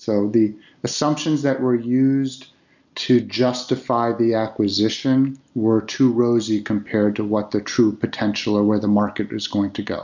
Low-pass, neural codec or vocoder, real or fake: 7.2 kHz; none; real